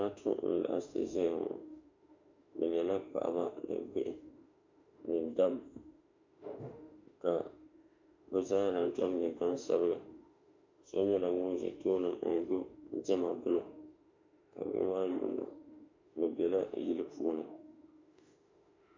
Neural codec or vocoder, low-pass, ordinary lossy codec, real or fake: autoencoder, 48 kHz, 32 numbers a frame, DAC-VAE, trained on Japanese speech; 7.2 kHz; MP3, 64 kbps; fake